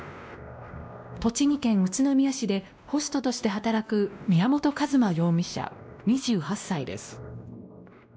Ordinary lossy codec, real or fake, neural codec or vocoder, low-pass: none; fake; codec, 16 kHz, 1 kbps, X-Codec, WavLM features, trained on Multilingual LibriSpeech; none